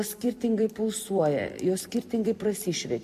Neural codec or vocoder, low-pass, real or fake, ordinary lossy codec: vocoder, 44.1 kHz, 128 mel bands every 256 samples, BigVGAN v2; 14.4 kHz; fake; MP3, 64 kbps